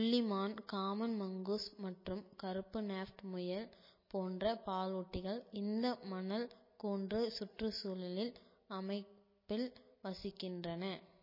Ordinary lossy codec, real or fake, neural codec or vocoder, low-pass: MP3, 24 kbps; real; none; 5.4 kHz